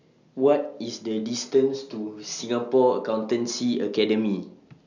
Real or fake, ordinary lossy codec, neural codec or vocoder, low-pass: real; none; none; 7.2 kHz